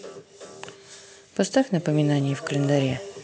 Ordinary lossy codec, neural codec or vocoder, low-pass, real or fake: none; none; none; real